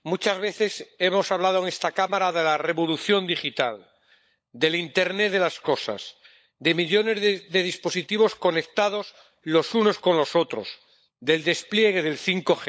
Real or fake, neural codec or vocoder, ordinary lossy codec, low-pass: fake; codec, 16 kHz, 16 kbps, FunCodec, trained on LibriTTS, 50 frames a second; none; none